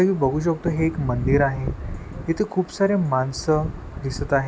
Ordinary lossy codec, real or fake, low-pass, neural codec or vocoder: none; real; none; none